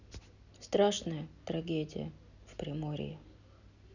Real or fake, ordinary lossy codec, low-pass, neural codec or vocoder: real; none; 7.2 kHz; none